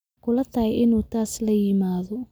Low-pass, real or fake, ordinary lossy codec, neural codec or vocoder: none; real; none; none